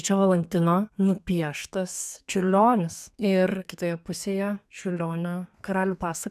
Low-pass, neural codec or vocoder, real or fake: 14.4 kHz; codec, 32 kHz, 1.9 kbps, SNAC; fake